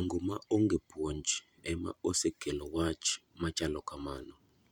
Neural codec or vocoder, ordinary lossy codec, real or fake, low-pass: vocoder, 44.1 kHz, 128 mel bands every 512 samples, BigVGAN v2; none; fake; 19.8 kHz